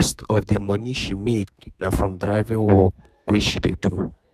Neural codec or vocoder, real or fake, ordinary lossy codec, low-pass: codec, 32 kHz, 1.9 kbps, SNAC; fake; none; 14.4 kHz